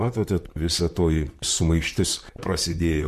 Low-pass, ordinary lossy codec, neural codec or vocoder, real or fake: 14.4 kHz; MP3, 64 kbps; vocoder, 44.1 kHz, 128 mel bands, Pupu-Vocoder; fake